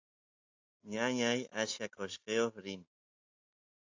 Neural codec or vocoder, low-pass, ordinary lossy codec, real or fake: none; 7.2 kHz; AAC, 48 kbps; real